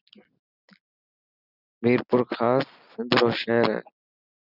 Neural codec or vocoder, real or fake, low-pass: none; real; 5.4 kHz